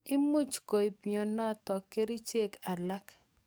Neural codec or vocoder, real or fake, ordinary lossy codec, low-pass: codec, 44.1 kHz, 7.8 kbps, DAC; fake; none; none